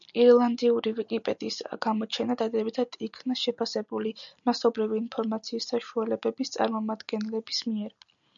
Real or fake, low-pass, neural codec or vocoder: real; 7.2 kHz; none